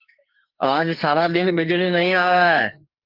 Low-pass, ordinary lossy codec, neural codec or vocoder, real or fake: 5.4 kHz; Opus, 32 kbps; codec, 44.1 kHz, 2.6 kbps, SNAC; fake